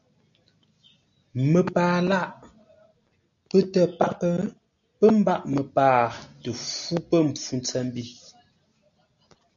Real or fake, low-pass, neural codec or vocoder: real; 7.2 kHz; none